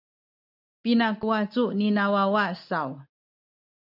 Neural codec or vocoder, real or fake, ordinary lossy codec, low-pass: none; real; AAC, 48 kbps; 5.4 kHz